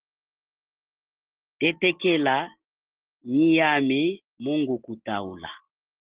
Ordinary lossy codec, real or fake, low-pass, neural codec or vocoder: Opus, 16 kbps; real; 3.6 kHz; none